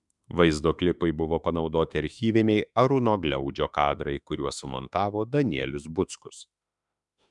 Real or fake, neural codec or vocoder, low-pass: fake; autoencoder, 48 kHz, 32 numbers a frame, DAC-VAE, trained on Japanese speech; 10.8 kHz